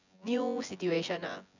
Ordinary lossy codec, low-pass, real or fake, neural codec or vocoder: none; 7.2 kHz; fake; vocoder, 24 kHz, 100 mel bands, Vocos